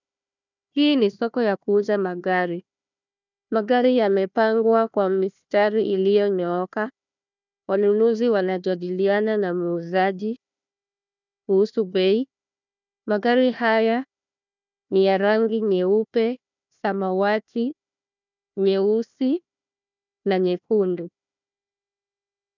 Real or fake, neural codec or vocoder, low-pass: fake; codec, 16 kHz, 1 kbps, FunCodec, trained on Chinese and English, 50 frames a second; 7.2 kHz